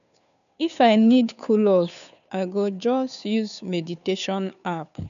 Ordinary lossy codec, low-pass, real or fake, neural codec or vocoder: none; 7.2 kHz; fake; codec, 16 kHz, 2 kbps, FunCodec, trained on Chinese and English, 25 frames a second